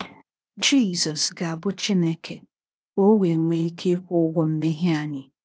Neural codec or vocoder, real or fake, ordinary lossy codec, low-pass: codec, 16 kHz, 0.8 kbps, ZipCodec; fake; none; none